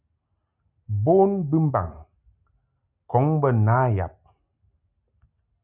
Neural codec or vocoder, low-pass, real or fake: none; 3.6 kHz; real